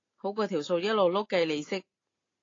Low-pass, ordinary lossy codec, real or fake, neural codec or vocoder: 7.2 kHz; AAC, 32 kbps; real; none